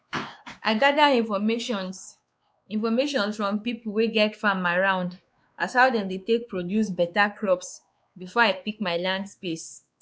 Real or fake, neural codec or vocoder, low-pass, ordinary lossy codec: fake; codec, 16 kHz, 2 kbps, X-Codec, WavLM features, trained on Multilingual LibriSpeech; none; none